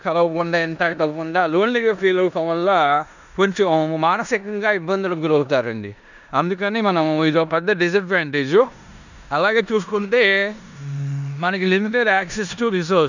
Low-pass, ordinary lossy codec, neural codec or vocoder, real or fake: 7.2 kHz; none; codec, 16 kHz in and 24 kHz out, 0.9 kbps, LongCat-Audio-Codec, four codebook decoder; fake